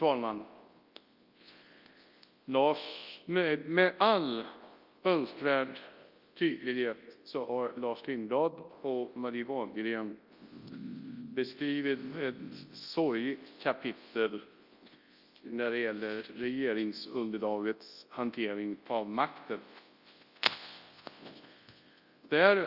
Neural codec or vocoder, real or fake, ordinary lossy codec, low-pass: codec, 24 kHz, 0.9 kbps, WavTokenizer, large speech release; fake; Opus, 32 kbps; 5.4 kHz